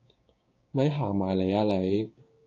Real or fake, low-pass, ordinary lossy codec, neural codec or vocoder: fake; 7.2 kHz; AAC, 32 kbps; codec, 16 kHz, 6 kbps, DAC